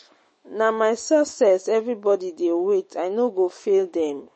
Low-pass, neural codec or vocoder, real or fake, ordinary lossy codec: 10.8 kHz; autoencoder, 48 kHz, 128 numbers a frame, DAC-VAE, trained on Japanese speech; fake; MP3, 32 kbps